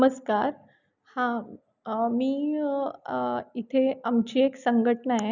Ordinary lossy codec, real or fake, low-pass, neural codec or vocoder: none; real; 7.2 kHz; none